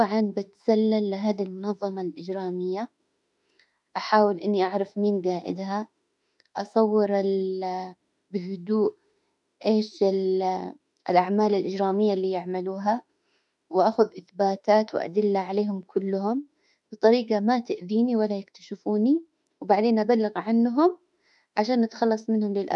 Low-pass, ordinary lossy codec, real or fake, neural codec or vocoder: 10.8 kHz; none; fake; autoencoder, 48 kHz, 32 numbers a frame, DAC-VAE, trained on Japanese speech